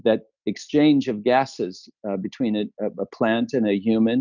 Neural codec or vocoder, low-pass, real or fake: none; 7.2 kHz; real